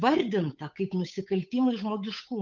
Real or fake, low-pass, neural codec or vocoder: fake; 7.2 kHz; codec, 16 kHz, 8 kbps, FunCodec, trained on Chinese and English, 25 frames a second